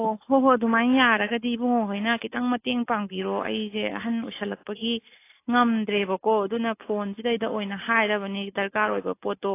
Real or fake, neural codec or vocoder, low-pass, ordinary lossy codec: real; none; 3.6 kHz; AAC, 24 kbps